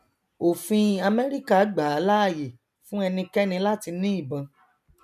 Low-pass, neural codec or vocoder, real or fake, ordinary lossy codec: 14.4 kHz; none; real; none